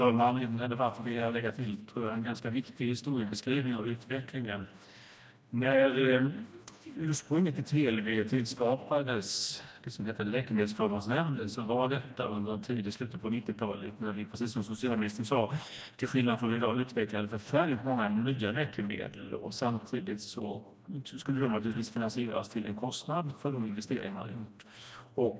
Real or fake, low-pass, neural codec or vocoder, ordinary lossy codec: fake; none; codec, 16 kHz, 1 kbps, FreqCodec, smaller model; none